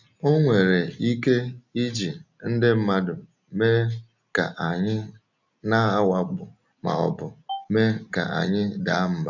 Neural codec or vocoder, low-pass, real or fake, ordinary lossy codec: none; 7.2 kHz; real; none